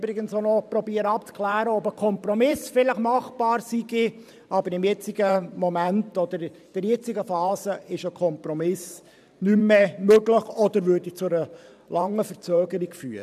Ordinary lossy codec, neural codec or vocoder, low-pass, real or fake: none; vocoder, 44.1 kHz, 128 mel bands every 512 samples, BigVGAN v2; 14.4 kHz; fake